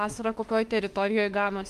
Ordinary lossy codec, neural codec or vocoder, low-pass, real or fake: Opus, 24 kbps; autoencoder, 48 kHz, 32 numbers a frame, DAC-VAE, trained on Japanese speech; 14.4 kHz; fake